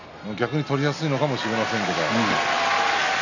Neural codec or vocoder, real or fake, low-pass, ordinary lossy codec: none; real; 7.2 kHz; none